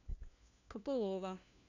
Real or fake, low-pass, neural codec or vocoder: fake; 7.2 kHz; codec, 16 kHz, 1 kbps, FunCodec, trained on LibriTTS, 50 frames a second